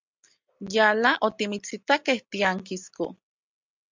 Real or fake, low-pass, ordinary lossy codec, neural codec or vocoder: real; 7.2 kHz; MP3, 64 kbps; none